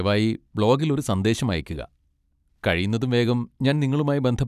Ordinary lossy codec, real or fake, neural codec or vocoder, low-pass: none; fake; vocoder, 44.1 kHz, 128 mel bands every 256 samples, BigVGAN v2; 14.4 kHz